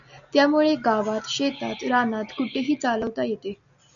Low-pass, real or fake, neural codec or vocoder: 7.2 kHz; real; none